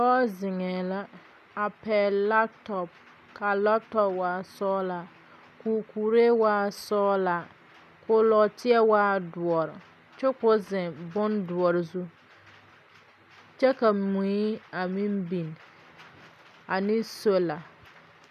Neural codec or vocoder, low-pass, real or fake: none; 14.4 kHz; real